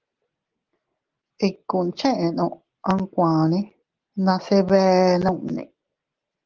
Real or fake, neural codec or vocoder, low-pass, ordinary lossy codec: real; none; 7.2 kHz; Opus, 16 kbps